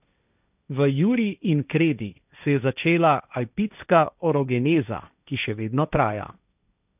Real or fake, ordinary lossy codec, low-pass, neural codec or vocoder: fake; none; 3.6 kHz; codec, 16 kHz, 1.1 kbps, Voila-Tokenizer